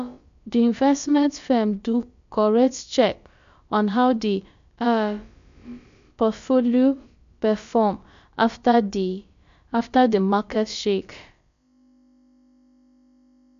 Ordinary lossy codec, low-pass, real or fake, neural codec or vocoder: MP3, 64 kbps; 7.2 kHz; fake; codec, 16 kHz, about 1 kbps, DyCAST, with the encoder's durations